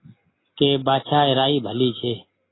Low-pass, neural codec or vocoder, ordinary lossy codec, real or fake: 7.2 kHz; none; AAC, 16 kbps; real